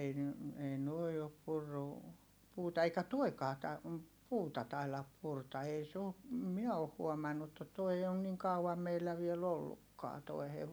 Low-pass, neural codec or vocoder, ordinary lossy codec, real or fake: none; none; none; real